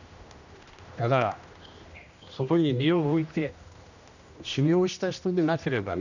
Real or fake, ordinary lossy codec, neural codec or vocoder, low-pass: fake; none; codec, 16 kHz, 1 kbps, X-Codec, HuBERT features, trained on general audio; 7.2 kHz